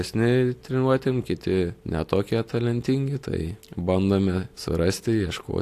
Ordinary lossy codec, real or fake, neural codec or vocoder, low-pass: AAC, 64 kbps; real; none; 14.4 kHz